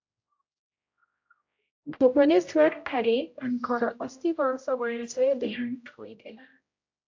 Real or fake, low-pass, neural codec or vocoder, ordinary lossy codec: fake; 7.2 kHz; codec, 16 kHz, 0.5 kbps, X-Codec, HuBERT features, trained on general audio; AAC, 48 kbps